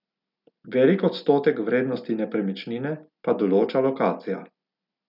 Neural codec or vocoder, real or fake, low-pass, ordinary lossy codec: none; real; 5.4 kHz; none